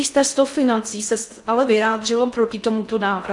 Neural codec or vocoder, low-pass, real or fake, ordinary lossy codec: codec, 16 kHz in and 24 kHz out, 0.6 kbps, FocalCodec, streaming, 4096 codes; 10.8 kHz; fake; AAC, 64 kbps